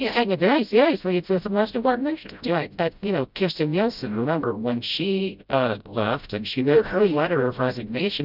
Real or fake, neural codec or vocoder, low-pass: fake; codec, 16 kHz, 0.5 kbps, FreqCodec, smaller model; 5.4 kHz